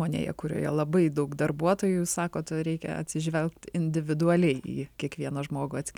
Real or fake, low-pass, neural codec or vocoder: real; 19.8 kHz; none